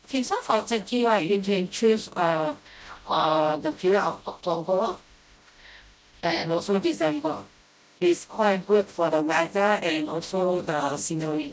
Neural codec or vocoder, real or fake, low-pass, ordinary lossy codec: codec, 16 kHz, 0.5 kbps, FreqCodec, smaller model; fake; none; none